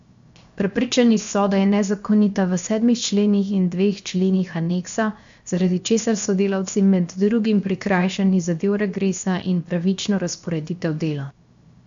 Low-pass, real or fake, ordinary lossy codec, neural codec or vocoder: 7.2 kHz; fake; MP3, 64 kbps; codec, 16 kHz, 0.7 kbps, FocalCodec